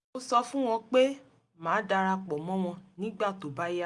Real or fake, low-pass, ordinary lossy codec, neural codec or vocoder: real; 10.8 kHz; Opus, 64 kbps; none